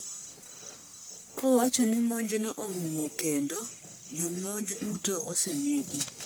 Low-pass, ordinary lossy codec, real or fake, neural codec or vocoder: none; none; fake; codec, 44.1 kHz, 1.7 kbps, Pupu-Codec